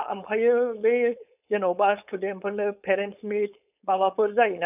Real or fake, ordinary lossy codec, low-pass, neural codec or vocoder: fake; none; 3.6 kHz; codec, 16 kHz, 4.8 kbps, FACodec